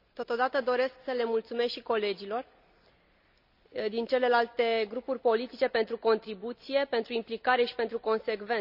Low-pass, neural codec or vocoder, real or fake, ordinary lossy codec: 5.4 kHz; none; real; none